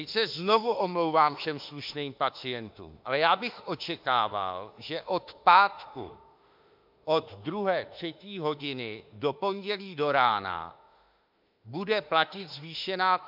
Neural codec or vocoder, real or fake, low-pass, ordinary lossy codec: autoencoder, 48 kHz, 32 numbers a frame, DAC-VAE, trained on Japanese speech; fake; 5.4 kHz; MP3, 48 kbps